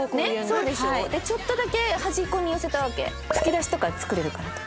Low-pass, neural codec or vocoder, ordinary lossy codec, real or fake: none; none; none; real